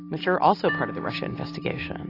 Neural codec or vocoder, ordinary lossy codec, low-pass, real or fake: none; AAC, 24 kbps; 5.4 kHz; real